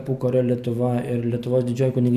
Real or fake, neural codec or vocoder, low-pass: real; none; 14.4 kHz